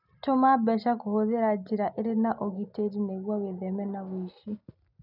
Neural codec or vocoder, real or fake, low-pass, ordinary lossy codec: none; real; 5.4 kHz; none